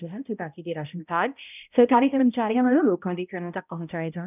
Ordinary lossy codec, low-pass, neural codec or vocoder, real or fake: none; 3.6 kHz; codec, 16 kHz, 0.5 kbps, X-Codec, HuBERT features, trained on balanced general audio; fake